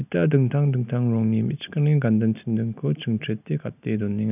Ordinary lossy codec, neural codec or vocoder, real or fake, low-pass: none; none; real; 3.6 kHz